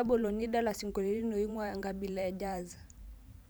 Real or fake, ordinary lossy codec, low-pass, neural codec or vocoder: fake; none; none; vocoder, 44.1 kHz, 128 mel bands every 512 samples, BigVGAN v2